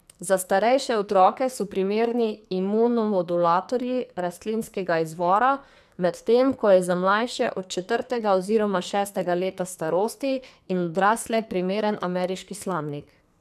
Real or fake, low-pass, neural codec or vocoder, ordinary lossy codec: fake; 14.4 kHz; codec, 44.1 kHz, 2.6 kbps, SNAC; none